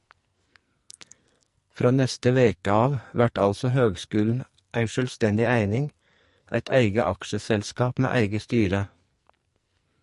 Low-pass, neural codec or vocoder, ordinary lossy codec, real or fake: 14.4 kHz; codec, 44.1 kHz, 2.6 kbps, SNAC; MP3, 48 kbps; fake